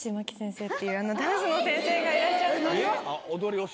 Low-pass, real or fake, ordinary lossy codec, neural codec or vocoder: none; real; none; none